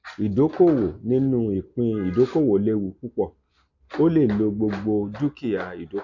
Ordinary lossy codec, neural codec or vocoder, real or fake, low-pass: none; none; real; 7.2 kHz